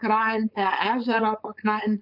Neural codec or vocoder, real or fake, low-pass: codec, 16 kHz, 4.8 kbps, FACodec; fake; 5.4 kHz